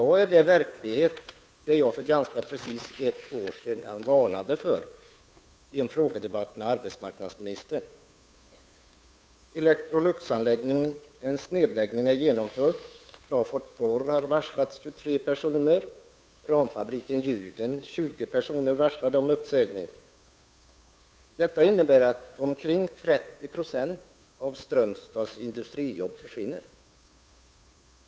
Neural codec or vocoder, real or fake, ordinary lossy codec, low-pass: codec, 16 kHz, 2 kbps, FunCodec, trained on Chinese and English, 25 frames a second; fake; none; none